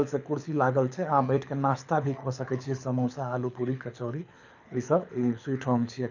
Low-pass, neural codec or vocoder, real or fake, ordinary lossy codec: 7.2 kHz; codec, 24 kHz, 6 kbps, HILCodec; fake; none